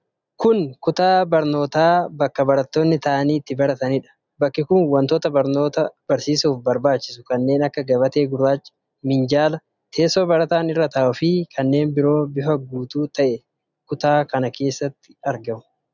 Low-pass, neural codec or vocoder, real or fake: 7.2 kHz; none; real